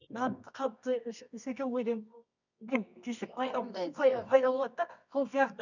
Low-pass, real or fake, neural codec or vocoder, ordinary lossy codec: 7.2 kHz; fake; codec, 24 kHz, 0.9 kbps, WavTokenizer, medium music audio release; none